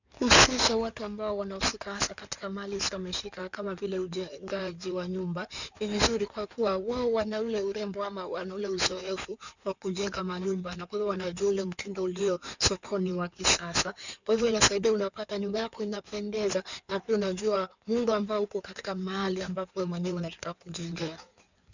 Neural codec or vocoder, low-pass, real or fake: codec, 16 kHz in and 24 kHz out, 2.2 kbps, FireRedTTS-2 codec; 7.2 kHz; fake